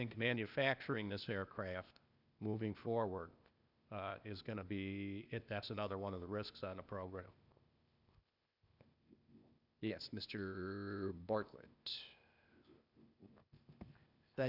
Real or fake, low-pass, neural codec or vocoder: fake; 5.4 kHz; codec, 16 kHz, 0.8 kbps, ZipCodec